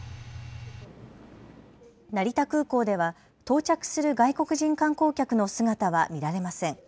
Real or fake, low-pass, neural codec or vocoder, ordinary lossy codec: real; none; none; none